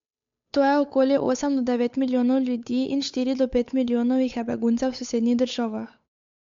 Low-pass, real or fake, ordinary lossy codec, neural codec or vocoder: 7.2 kHz; fake; MP3, 64 kbps; codec, 16 kHz, 8 kbps, FunCodec, trained on Chinese and English, 25 frames a second